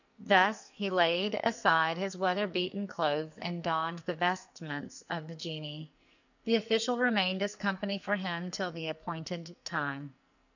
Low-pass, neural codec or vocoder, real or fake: 7.2 kHz; codec, 44.1 kHz, 2.6 kbps, SNAC; fake